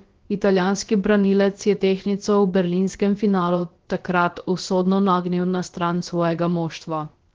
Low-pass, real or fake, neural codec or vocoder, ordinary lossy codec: 7.2 kHz; fake; codec, 16 kHz, about 1 kbps, DyCAST, with the encoder's durations; Opus, 16 kbps